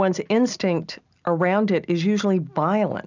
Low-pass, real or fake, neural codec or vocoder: 7.2 kHz; real; none